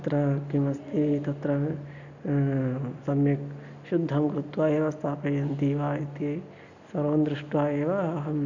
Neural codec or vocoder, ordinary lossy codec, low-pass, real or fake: none; none; 7.2 kHz; real